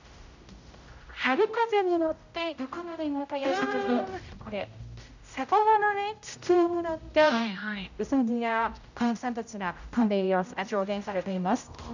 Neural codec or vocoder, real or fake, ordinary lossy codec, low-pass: codec, 16 kHz, 0.5 kbps, X-Codec, HuBERT features, trained on general audio; fake; none; 7.2 kHz